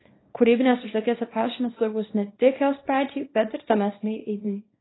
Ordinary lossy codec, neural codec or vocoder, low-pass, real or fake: AAC, 16 kbps; codec, 24 kHz, 0.9 kbps, WavTokenizer, small release; 7.2 kHz; fake